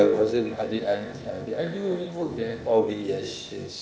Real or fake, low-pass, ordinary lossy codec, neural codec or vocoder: fake; none; none; codec, 16 kHz, 0.8 kbps, ZipCodec